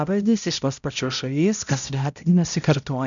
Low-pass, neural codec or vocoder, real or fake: 7.2 kHz; codec, 16 kHz, 0.5 kbps, X-Codec, HuBERT features, trained on balanced general audio; fake